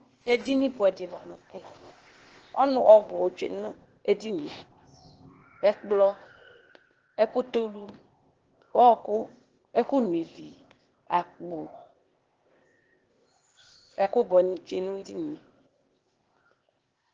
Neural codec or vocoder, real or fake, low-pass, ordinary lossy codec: codec, 16 kHz, 0.8 kbps, ZipCodec; fake; 7.2 kHz; Opus, 16 kbps